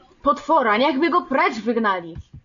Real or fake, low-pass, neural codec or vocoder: real; 7.2 kHz; none